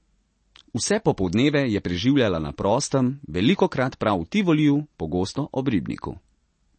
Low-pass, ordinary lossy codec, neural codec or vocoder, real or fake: 10.8 kHz; MP3, 32 kbps; none; real